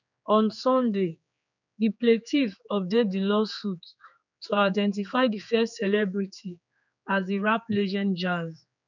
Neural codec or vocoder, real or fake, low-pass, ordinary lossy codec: codec, 16 kHz, 4 kbps, X-Codec, HuBERT features, trained on general audio; fake; 7.2 kHz; none